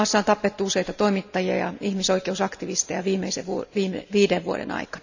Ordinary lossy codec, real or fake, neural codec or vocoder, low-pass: none; real; none; 7.2 kHz